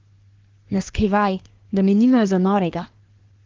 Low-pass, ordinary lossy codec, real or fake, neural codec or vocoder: 7.2 kHz; Opus, 16 kbps; fake; codec, 24 kHz, 1 kbps, SNAC